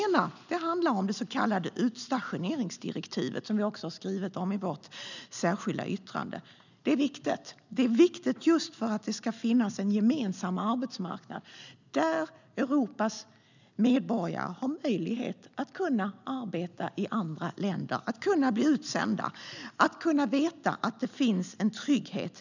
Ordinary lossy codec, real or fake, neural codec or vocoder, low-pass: none; real; none; 7.2 kHz